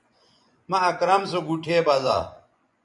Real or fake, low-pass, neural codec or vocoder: fake; 10.8 kHz; vocoder, 24 kHz, 100 mel bands, Vocos